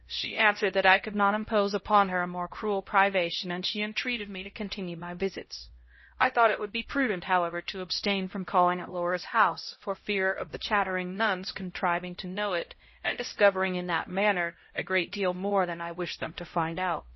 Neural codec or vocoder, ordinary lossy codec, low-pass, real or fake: codec, 16 kHz, 0.5 kbps, X-Codec, HuBERT features, trained on LibriSpeech; MP3, 24 kbps; 7.2 kHz; fake